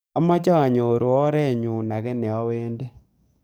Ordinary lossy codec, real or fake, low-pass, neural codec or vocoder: none; fake; none; codec, 44.1 kHz, 7.8 kbps, DAC